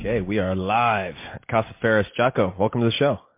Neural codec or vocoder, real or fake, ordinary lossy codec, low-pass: none; real; MP3, 24 kbps; 3.6 kHz